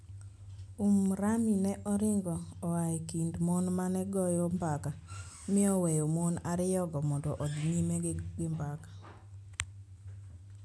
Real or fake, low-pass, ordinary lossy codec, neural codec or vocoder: real; none; none; none